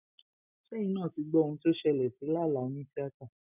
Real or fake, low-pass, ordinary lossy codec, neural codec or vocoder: real; 3.6 kHz; none; none